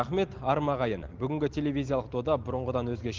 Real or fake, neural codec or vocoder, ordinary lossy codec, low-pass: real; none; Opus, 16 kbps; 7.2 kHz